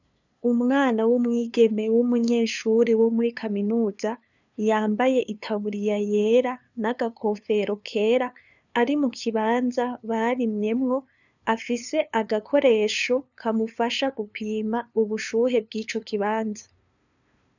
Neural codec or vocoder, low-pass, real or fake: codec, 16 kHz, 2 kbps, FunCodec, trained on LibriTTS, 25 frames a second; 7.2 kHz; fake